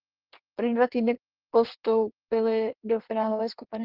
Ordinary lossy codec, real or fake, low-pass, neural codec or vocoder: Opus, 16 kbps; fake; 5.4 kHz; codec, 16 kHz in and 24 kHz out, 1.1 kbps, FireRedTTS-2 codec